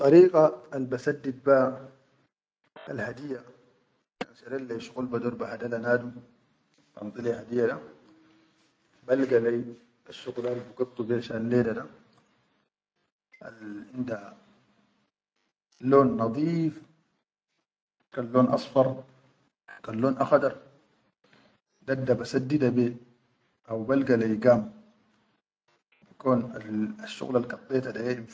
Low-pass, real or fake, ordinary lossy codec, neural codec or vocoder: none; real; none; none